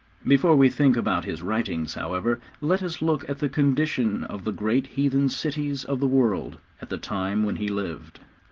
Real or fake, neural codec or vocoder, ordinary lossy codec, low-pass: real; none; Opus, 16 kbps; 7.2 kHz